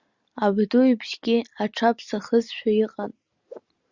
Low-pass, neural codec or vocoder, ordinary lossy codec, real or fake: 7.2 kHz; none; Opus, 64 kbps; real